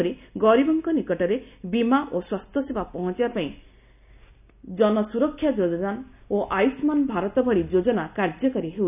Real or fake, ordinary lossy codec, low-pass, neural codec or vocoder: real; none; 3.6 kHz; none